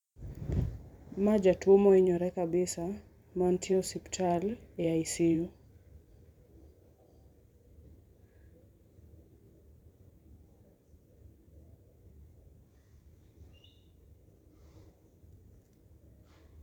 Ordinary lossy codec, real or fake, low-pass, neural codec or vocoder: none; fake; 19.8 kHz; vocoder, 44.1 kHz, 128 mel bands every 512 samples, BigVGAN v2